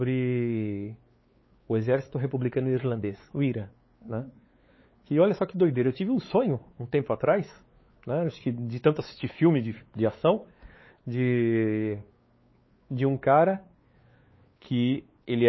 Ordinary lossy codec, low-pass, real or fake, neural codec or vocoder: MP3, 24 kbps; 7.2 kHz; fake; codec, 16 kHz, 4 kbps, X-Codec, WavLM features, trained on Multilingual LibriSpeech